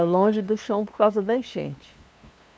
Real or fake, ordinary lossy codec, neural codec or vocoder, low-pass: fake; none; codec, 16 kHz, 2 kbps, FunCodec, trained on LibriTTS, 25 frames a second; none